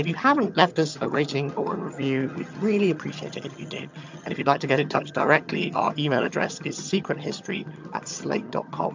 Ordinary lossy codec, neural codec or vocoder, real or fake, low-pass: MP3, 64 kbps; vocoder, 22.05 kHz, 80 mel bands, HiFi-GAN; fake; 7.2 kHz